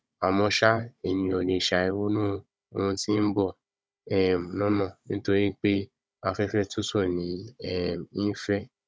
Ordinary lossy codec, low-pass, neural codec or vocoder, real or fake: none; none; codec, 16 kHz, 16 kbps, FunCodec, trained on Chinese and English, 50 frames a second; fake